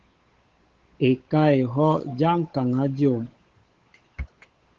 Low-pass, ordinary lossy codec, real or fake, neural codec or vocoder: 7.2 kHz; Opus, 16 kbps; fake; codec, 16 kHz, 8 kbps, FunCodec, trained on Chinese and English, 25 frames a second